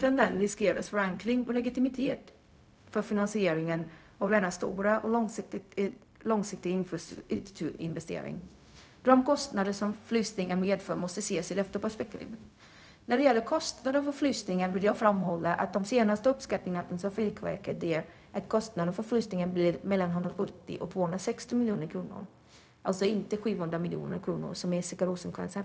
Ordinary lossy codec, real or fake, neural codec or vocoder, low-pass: none; fake; codec, 16 kHz, 0.4 kbps, LongCat-Audio-Codec; none